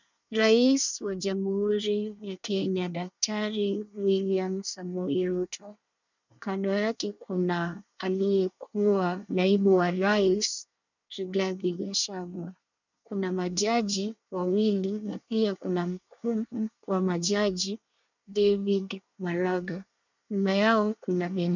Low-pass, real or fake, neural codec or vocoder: 7.2 kHz; fake; codec, 24 kHz, 1 kbps, SNAC